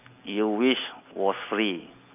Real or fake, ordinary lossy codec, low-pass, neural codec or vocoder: real; none; 3.6 kHz; none